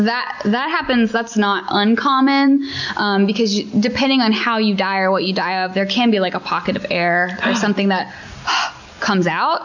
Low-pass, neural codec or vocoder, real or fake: 7.2 kHz; none; real